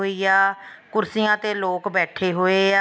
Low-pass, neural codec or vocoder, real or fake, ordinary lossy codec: none; none; real; none